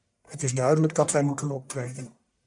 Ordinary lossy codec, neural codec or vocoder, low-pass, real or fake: MP3, 96 kbps; codec, 44.1 kHz, 1.7 kbps, Pupu-Codec; 10.8 kHz; fake